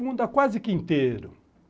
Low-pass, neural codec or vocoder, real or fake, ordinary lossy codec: none; none; real; none